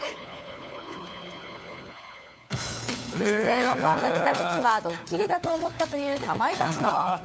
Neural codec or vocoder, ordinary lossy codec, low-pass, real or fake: codec, 16 kHz, 4 kbps, FunCodec, trained on LibriTTS, 50 frames a second; none; none; fake